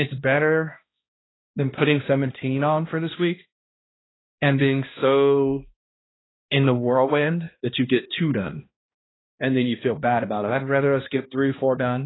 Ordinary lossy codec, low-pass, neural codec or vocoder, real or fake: AAC, 16 kbps; 7.2 kHz; codec, 16 kHz, 1 kbps, X-Codec, HuBERT features, trained on balanced general audio; fake